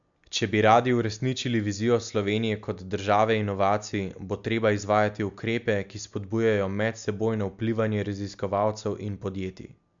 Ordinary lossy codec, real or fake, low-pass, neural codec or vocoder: MP3, 64 kbps; real; 7.2 kHz; none